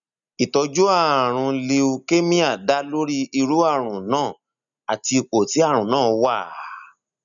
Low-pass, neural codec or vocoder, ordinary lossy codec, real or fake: 7.2 kHz; none; none; real